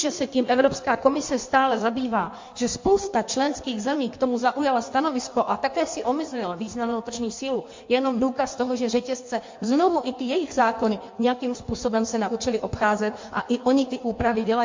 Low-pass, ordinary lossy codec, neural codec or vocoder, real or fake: 7.2 kHz; MP3, 48 kbps; codec, 16 kHz in and 24 kHz out, 1.1 kbps, FireRedTTS-2 codec; fake